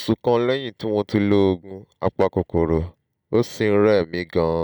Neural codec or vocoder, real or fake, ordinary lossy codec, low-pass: none; real; none; none